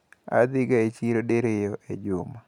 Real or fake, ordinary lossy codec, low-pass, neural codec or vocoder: real; none; 19.8 kHz; none